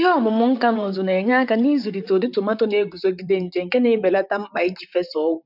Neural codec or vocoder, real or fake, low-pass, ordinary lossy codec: vocoder, 44.1 kHz, 128 mel bands, Pupu-Vocoder; fake; 5.4 kHz; none